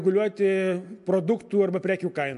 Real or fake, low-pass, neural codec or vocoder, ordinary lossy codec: real; 10.8 kHz; none; MP3, 64 kbps